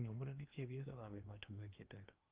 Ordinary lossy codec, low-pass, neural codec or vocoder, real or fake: Opus, 24 kbps; 3.6 kHz; codec, 16 kHz in and 24 kHz out, 0.8 kbps, FocalCodec, streaming, 65536 codes; fake